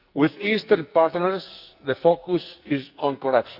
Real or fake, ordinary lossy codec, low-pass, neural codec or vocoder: fake; Opus, 64 kbps; 5.4 kHz; codec, 44.1 kHz, 2.6 kbps, SNAC